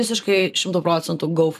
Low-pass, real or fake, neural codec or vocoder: 14.4 kHz; fake; vocoder, 44.1 kHz, 128 mel bands every 512 samples, BigVGAN v2